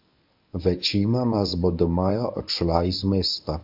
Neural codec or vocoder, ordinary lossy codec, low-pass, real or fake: codec, 24 kHz, 0.9 kbps, WavTokenizer, medium speech release version 1; MP3, 48 kbps; 5.4 kHz; fake